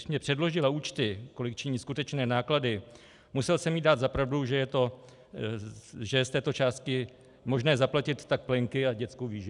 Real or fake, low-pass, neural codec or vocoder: real; 10.8 kHz; none